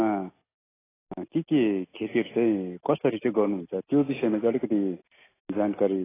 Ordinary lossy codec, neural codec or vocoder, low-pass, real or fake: AAC, 16 kbps; none; 3.6 kHz; real